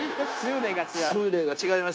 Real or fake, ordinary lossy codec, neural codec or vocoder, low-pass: fake; none; codec, 16 kHz, 0.9 kbps, LongCat-Audio-Codec; none